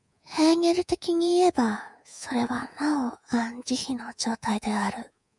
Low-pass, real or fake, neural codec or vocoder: 10.8 kHz; fake; codec, 24 kHz, 3.1 kbps, DualCodec